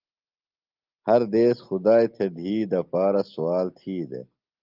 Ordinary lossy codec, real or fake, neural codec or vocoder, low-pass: Opus, 32 kbps; real; none; 5.4 kHz